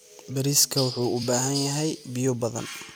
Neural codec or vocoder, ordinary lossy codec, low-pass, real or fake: vocoder, 44.1 kHz, 128 mel bands every 256 samples, BigVGAN v2; none; none; fake